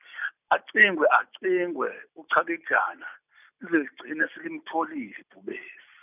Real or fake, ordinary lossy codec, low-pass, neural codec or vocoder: real; none; 3.6 kHz; none